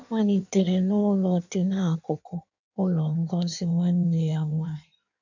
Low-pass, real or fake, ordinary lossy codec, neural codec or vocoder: 7.2 kHz; fake; none; codec, 16 kHz in and 24 kHz out, 1.1 kbps, FireRedTTS-2 codec